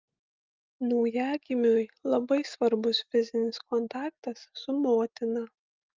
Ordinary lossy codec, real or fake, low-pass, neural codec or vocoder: Opus, 24 kbps; real; 7.2 kHz; none